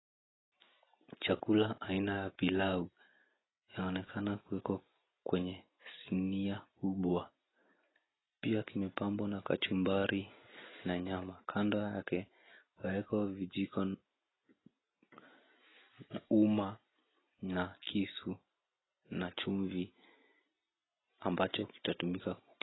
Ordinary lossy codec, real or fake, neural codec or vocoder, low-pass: AAC, 16 kbps; real; none; 7.2 kHz